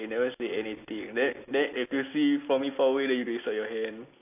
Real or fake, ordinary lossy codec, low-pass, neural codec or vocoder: real; AAC, 32 kbps; 3.6 kHz; none